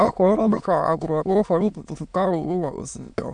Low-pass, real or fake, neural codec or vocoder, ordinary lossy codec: 9.9 kHz; fake; autoencoder, 22.05 kHz, a latent of 192 numbers a frame, VITS, trained on many speakers; none